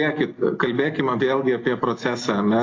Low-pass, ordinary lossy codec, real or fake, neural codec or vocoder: 7.2 kHz; AAC, 32 kbps; real; none